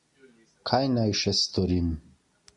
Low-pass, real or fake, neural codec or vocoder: 10.8 kHz; real; none